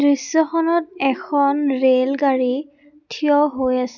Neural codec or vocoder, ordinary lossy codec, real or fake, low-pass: none; none; real; 7.2 kHz